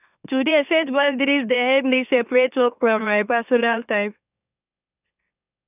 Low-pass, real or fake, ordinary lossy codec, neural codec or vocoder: 3.6 kHz; fake; none; autoencoder, 44.1 kHz, a latent of 192 numbers a frame, MeloTTS